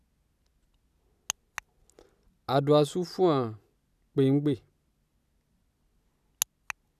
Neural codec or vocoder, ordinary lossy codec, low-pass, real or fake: none; none; 14.4 kHz; real